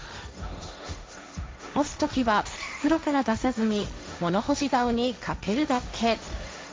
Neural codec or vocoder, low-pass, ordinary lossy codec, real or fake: codec, 16 kHz, 1.1 kbps, Voila-Tokenizer; none; none; fake